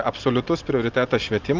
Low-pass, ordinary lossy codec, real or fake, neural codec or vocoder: 7.2 kHz; Opus, 32 kbps; real; none